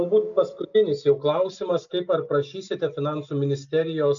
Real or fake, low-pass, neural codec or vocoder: real; 7.2 kHz; none